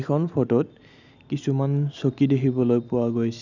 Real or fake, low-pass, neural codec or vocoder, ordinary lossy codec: real; 7.2 kHz; none; none